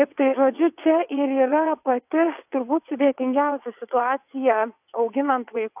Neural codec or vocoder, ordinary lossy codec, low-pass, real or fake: vocoder, 22.05 kHz, 80 mel bands, WaveNeXt; AAC, 32 kbps; 3.6 kHz; fake